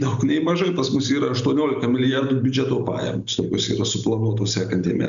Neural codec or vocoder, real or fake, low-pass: none; real; 7.2 kHz